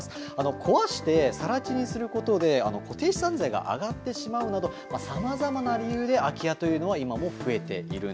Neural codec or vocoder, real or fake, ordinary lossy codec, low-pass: none; real; none; none